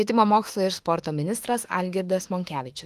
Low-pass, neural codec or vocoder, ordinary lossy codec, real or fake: 14.4 kHz; autoencoder, 48 kHz, 32 numbers a frame, DAC-VAE, trained on Japanese speech; Opus, 24 kbps; fake